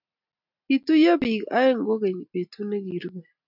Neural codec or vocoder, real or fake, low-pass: none; real; 5.4 kHz